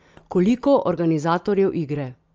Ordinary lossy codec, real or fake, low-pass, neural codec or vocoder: Opus, 24 kbps; real; 7.2 kHz; none